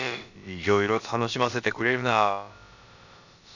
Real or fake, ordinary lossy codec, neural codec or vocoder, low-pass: fake; none; codec, 16 kHz, about 1 kbps, DyCAST, with the encoder's durations; 7.2 kHz